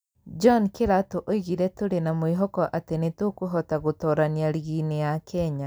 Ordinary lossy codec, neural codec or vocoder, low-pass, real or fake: none; none; none; real